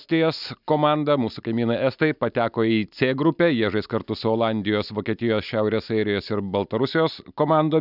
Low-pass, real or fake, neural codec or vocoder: 5.4 kHz; real; none